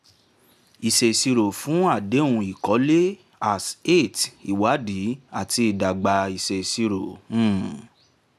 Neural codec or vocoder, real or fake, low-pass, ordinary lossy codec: none; real; 14.4 kHz; none